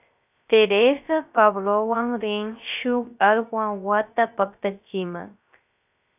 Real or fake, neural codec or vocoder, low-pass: fake; codec, 16 kHz, 0.3 kbps, FocalCodec; 3.6 kHz